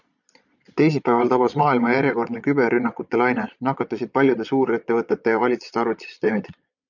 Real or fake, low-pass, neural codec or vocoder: fake; 7.2 kHz; vocoder, 22.05 kHz, 80 mel bands, Vocos